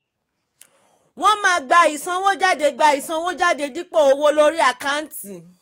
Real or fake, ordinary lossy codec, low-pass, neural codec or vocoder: fake; AAC, 48 kbps; 19.8 kHz; codec, 44.1 kHz, 7.8 kbps, DAC